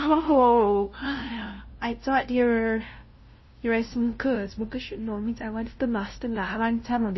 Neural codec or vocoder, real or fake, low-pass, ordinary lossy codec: codec, 16 kHz, 0.5 kbps, FunCodec, trained on LibriTTS, 25 frames a second; fake; 7.2 kHz; MP3, 24 kbps